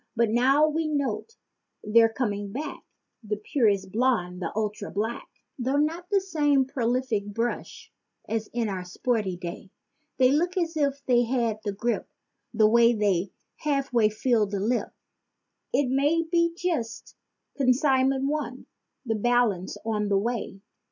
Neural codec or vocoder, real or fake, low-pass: none; real; 7.2 kHz